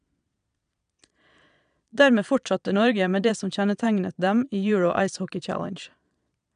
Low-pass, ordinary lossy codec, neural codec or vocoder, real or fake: 9.9 kHz; none; vocoder, 22.05 kHz, 80 mel bands, Vocos; fake